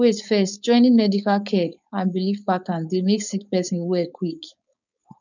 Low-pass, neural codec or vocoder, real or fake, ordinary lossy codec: 7.2 kHz; codec, 16 kHz, 4.8 kbps, FACodec; fake; none